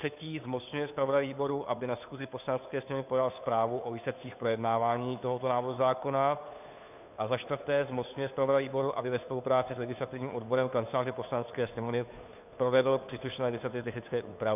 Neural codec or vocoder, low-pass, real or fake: codec, 16 kHz, 2 kbps, FunCodec, trained on Chinese and English, 25 frames a second; 3.6 kHz; fake